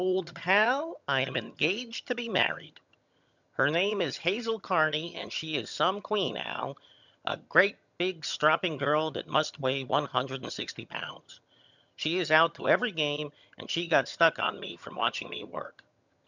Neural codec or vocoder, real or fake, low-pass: vocoder, 22.05 kHz, 80 mel bands, HiFi-GAN; fake; 7.2 kHz